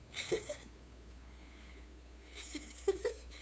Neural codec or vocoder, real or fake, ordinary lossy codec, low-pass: codec, 16 kHz, 2 kbps, FunCodec, trained on LibriTTS, 25 frames a second; fake; none; none